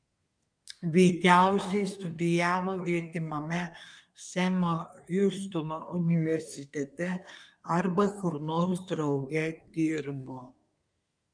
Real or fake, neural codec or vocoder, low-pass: fake; codec, 24 kHz, 1 kbps, SNAC; 9.9 kHz